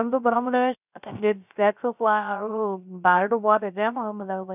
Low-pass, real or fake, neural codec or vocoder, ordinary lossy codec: 3.6 kHz; fake; codec, 16 kHz, 0.3 kbps, FocalCodec; none